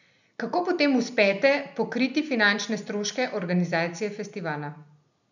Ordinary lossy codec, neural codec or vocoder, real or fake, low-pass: none; none; real; 7.2 kHz